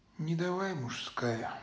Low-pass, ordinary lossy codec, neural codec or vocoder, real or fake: none; none; none; real